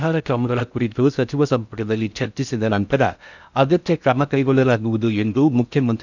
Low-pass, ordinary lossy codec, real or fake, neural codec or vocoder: 7.2 kHz; none; fake; codec, 16 kHz in and 24 kHz out, 0.6 kbps, FocalCodec, streaming, 2048 codes